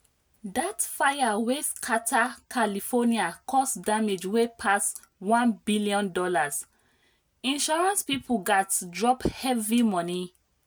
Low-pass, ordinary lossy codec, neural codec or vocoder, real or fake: none; none; none; real